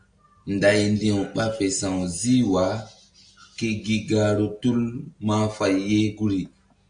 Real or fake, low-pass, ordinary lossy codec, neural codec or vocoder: real; 9.9 kHz; AAC, 64 kbps; none